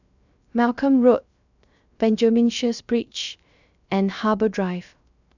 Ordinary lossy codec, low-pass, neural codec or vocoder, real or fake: none; 7.2 kHz; codec, 16 kHz, 0.3 kbps, FocalCodec; fake